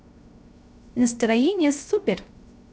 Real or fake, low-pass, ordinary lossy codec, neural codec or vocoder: fake; none; none; codec, 16 kHz, 0.3 kbps, FocalCodec